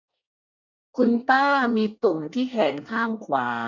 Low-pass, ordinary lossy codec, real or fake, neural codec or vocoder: 7.2 kHz; none; fake; codec, 24 kHz, 1 kbps, SNAC